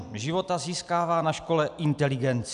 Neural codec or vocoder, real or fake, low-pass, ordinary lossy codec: none; real; 10.8 kHz; AAC, 96 kbps